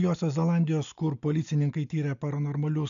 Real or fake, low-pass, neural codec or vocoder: real; 7.2 kHz; none